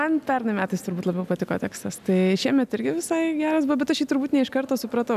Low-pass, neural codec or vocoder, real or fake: 14.4 kHz; none; real